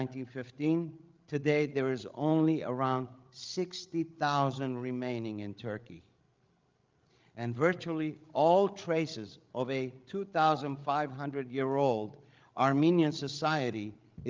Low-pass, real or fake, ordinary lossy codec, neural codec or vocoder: 7.2 kHz; fake; Opus, 32 kbps; codec, 16 kHz, 16 kbps, FreqCodec, larger model